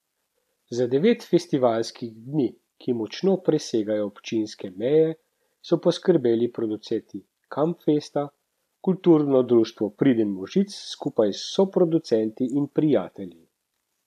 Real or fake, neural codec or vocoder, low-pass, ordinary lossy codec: real; none; 14.4 kHz; none